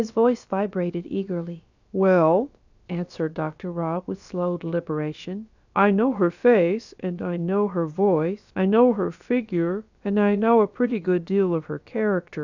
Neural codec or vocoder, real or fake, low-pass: codec, 16 kHz, about 1 kbps, DyCAST, with the encoder's durations; fake; 7.2 kHz